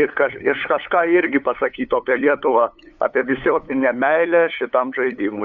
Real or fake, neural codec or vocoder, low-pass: fake; codec, 16 kHz, 8 kbps, FunCodec, trained on LibriTTS, 25 frames a second; 7.2 kHz